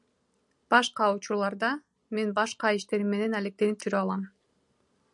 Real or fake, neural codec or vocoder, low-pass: real; none; 10.8 kHz